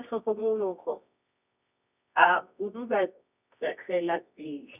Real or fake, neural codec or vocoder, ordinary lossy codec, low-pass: fake; codec, 24 kHz, 0.9 kbps, WavTokenizer, medium music audio release; none; 3.6 kHz